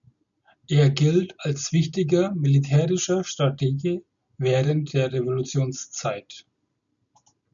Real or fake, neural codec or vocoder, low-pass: real; none; 7.2 kHz